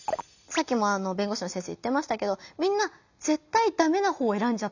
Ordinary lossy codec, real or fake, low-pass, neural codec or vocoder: none; real; 7.2 kHz; none